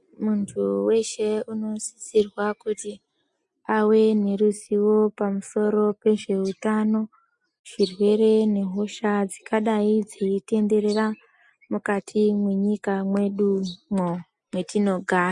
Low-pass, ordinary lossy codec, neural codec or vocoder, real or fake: 10.8 kHz; MP3, 64 kbps; none; real